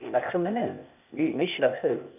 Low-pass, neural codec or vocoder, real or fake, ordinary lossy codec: 3.6 kHz; codec, 16 kHz, 0.8 kbps, ZipCodec; fake; none